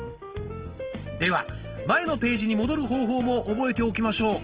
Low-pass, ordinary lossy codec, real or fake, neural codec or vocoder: 3.6 kHz; Opus, 16 kbps; real; none